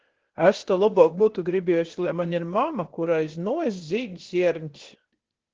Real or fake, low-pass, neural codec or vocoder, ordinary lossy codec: fake; 7.2 kHz; codec, 16 kHz, 0.8 kbps, ZipCodec; Opus, 16 kbps